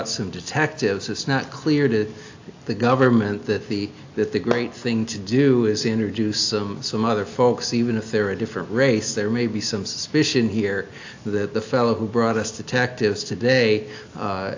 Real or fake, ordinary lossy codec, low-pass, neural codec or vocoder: real; AAC, 48 kbps; 7.2 kHz; none